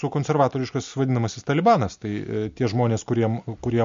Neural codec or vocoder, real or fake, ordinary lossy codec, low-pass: none; real; MP3, 48 kbps; 7.2 kHz